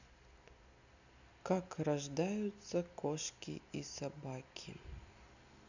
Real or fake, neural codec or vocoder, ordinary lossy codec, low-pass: real; none; none; 7.2 kHz